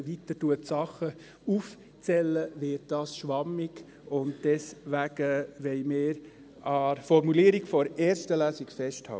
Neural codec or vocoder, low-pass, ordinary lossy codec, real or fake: none; none; none; real